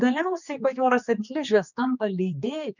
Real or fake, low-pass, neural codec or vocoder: fake; 7.2 kHz; codec, 16 kHz, 2 kbps, X-Codec, HuBERT features, trained on general audio